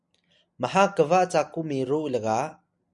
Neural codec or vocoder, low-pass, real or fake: none; 10.8 kHz; real